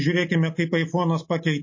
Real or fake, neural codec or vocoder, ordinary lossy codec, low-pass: real; none; MP3, 32 kbps; 7.2 kHz